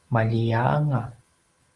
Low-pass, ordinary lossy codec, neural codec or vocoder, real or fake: 10.8 kHz; Opus, 24 kbps; none; real